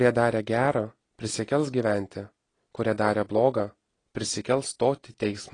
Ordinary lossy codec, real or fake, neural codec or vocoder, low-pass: AAC, 32 kbps; real; none; 9.9 kHz